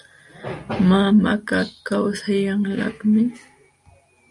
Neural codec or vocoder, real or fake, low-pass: none; real; 10.8 kHz